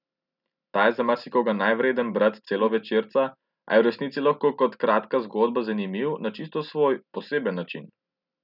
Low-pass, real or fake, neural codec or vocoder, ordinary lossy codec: 5.4 kHz; real; none; none